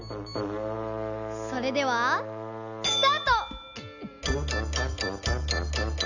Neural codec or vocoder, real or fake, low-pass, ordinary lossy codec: none; real; 7.2 kHz; none